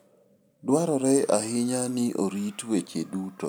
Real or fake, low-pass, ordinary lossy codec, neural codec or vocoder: real; none; none; none